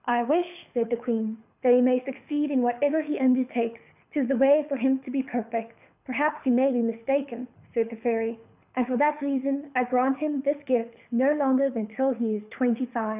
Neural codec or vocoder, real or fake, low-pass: codec, 24 kHz, 6 kbps, HILCodec; fake; 3.6 kHz